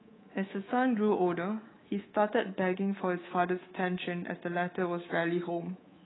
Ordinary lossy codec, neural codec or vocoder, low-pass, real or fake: AAC, 16 kbps; codec, 24 kHz, 3.1 kbps, DualCodec; 7.2 kHz; fake